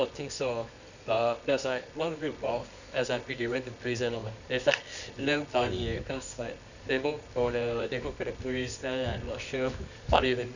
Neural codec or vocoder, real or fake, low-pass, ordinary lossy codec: codec, 24 kHz, 0.9 kbps, WavTokenizer, medium music audio release; fake; 7.2 kHz; none